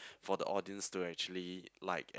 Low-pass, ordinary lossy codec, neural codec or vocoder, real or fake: none; none; none; real